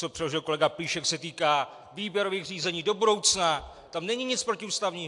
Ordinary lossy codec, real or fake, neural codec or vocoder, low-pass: AAC, 64 kbps; real; none; 10.8 kHz